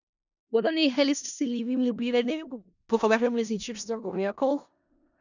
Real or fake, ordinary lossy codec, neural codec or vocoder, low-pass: fake; none; codec, 16 kHz in and 24 kHz out, 0.4 kbps, LongCat-Audio-Codec, four codebook decoder; 7.2 kHz